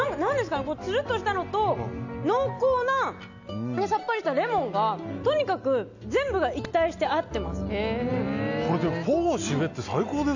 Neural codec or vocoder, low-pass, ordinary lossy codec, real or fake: none; 7.2 kHz; none; real